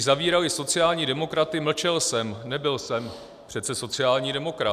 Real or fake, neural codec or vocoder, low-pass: real; none; 14.4 kHz